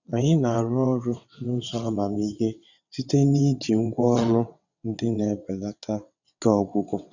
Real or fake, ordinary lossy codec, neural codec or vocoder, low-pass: fake; none; vocoder, 22.05 kHz, 80 mel bands, WaveNeXt; 7.2 kHz